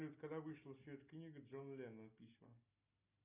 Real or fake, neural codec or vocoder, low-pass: real; none; 3.6 kHz